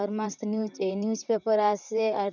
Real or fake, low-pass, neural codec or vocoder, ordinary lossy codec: fake; 7.2 kHz; vocoder, 44.1 kHz, 128 mel bands, Pupu-Vocoder; none